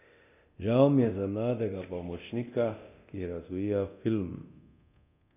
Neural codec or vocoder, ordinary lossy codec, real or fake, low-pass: codec, 24 kHz, 0.9 kbps, DualCodec; none; fake; 3.6 kHz